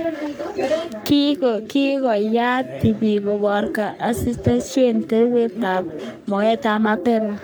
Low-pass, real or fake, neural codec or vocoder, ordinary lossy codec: none; fake; codec, 44.1 kHz, 3.4 kbps, Pupu-Codec; none